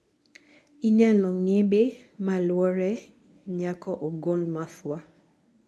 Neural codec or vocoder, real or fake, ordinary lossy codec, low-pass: codec, 24 kHz, 0.9 kbps, WavTokenizer, medium speech release version 1; fake; none; none